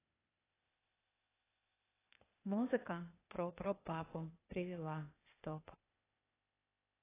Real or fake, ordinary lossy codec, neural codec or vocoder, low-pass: fake; AAC, 16 kbps; codec, 16 kHz, 0.8 kbps, ZipCodec; 3.6 kHz